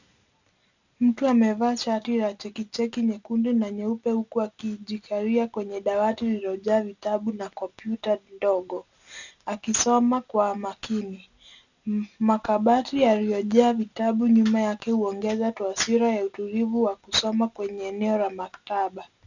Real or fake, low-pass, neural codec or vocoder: real; 7.2 kHz; none